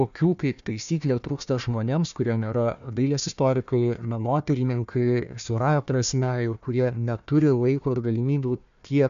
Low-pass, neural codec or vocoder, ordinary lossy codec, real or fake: 7.2 kHz; codec, 16 kHz, 1 kbps, FunCodec, trained on Chinese and English, 50 frames a second; AAC, 96 kbps; fake